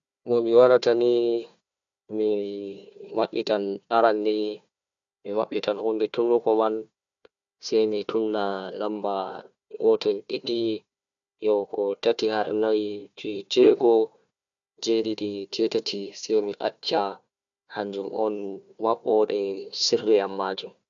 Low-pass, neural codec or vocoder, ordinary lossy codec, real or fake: 7.2 kHz; codec, 16 kHz, 1 kbps, FunCodec, trained on Chinese and English, 50 frames a second; none; fake